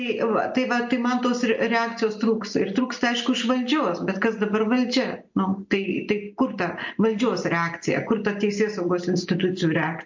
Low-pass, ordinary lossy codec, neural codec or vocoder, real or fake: 7.2 kHz; MP3, 48 kbps; none; real